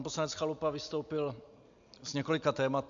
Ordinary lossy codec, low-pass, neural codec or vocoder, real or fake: MP3, 64 kbps; 7.2 kHz; none; real